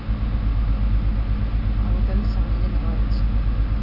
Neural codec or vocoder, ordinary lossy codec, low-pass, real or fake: none; none; 5.4 kHz; real